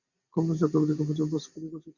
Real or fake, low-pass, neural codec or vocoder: real; 7.2 kHz; none